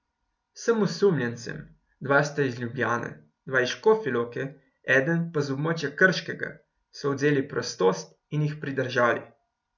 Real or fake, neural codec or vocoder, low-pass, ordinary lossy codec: real; none; 7.2 kHz; none